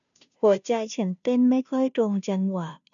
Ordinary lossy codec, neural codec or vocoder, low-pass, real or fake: none; codec, 16 kHz, 0.5 kbps, FunCodec, trained on Chinese and English, 25 frames a second; 7.2 kHz; fake